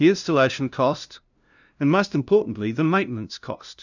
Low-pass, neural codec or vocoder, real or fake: 7.2 kHz; codec, 16 kHz, 0.5 kbps, FunCodec, trained on LibriTTS, 25 frames a second; fake